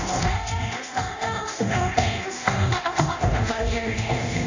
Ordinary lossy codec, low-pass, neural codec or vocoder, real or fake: AAC, 48 kbps; 7.2 kHz; codec, 24 kHz, 0.9 kbps, DualCodec; fake